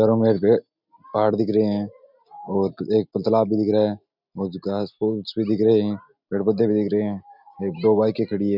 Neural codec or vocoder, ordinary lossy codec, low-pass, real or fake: none; none; 5.4 kHz; real